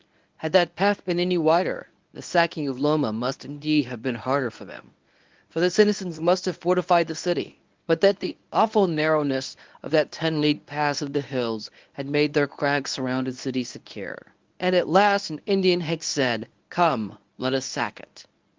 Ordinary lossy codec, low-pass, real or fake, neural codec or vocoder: Opus, 32 kbps; 7.2 kHz; fake; codec, 24 kHz, 0.9 kbps, WavTokenizer, medium speech release version 1